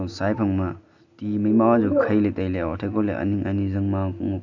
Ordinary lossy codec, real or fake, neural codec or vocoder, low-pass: none; real; none; 7.2 kHz